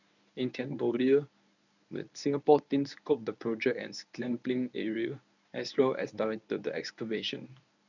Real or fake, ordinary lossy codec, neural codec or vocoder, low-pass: fake; none; codec, 24 kHz, 0.9 kbps, WavTokenizer, medium speech release version 1; 7.2 kHz